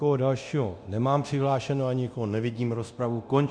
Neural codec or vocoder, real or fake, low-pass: codec, 24 kHz, 0.9 kbps, DualCodec; fake; 9.9 kHz